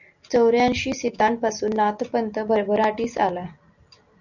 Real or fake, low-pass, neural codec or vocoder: real; 7.2 kHz; none